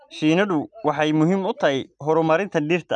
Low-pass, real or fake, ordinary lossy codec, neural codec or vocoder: 9.9 kHz; real; none; none